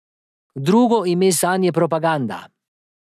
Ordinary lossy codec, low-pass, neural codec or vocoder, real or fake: none; 14.4 kHz; none; real